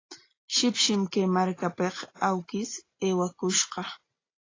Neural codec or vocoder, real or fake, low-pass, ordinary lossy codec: none; real; 7.2 kHz; AAC, 32 kbps